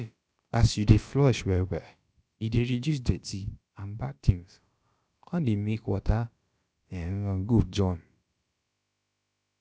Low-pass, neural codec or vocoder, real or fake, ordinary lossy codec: none; codec, 16 kHz, about 1 kbps, DyCAST, with the encoder's durations; fake; none